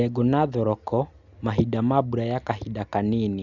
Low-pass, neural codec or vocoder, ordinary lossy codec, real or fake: 7.2 kHz; none; none; real